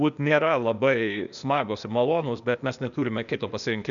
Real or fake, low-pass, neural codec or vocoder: fake; 7.2 kHz; codec, 16 kHz, 0.8 kbps, ZipCodec